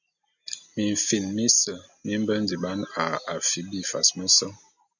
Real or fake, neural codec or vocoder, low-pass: real; none; 7.2 kHz